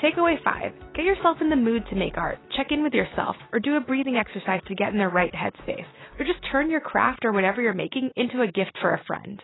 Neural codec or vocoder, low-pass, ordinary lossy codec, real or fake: none; 7.2 kHz; AAC, 16 kbps; real